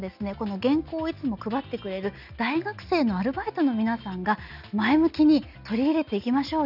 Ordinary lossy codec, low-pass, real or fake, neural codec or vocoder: none; 5.4 kHz; real; none